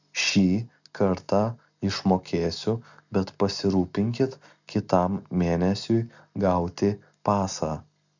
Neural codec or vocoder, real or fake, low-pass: none; real; 7.2 kHz